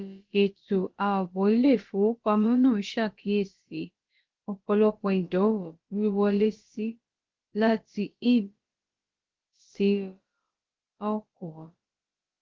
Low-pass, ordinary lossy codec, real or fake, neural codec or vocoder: 7.2 kHz; Opus, 16 kbps; fake; codec, 16 kHz, about 1 kbps, DyCAST, with the encoder's durations